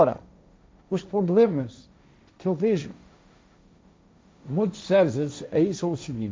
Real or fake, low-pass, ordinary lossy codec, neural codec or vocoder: fake; none; none; codec, 16 kHz, 1.1 kbps, Voila-Tokenizer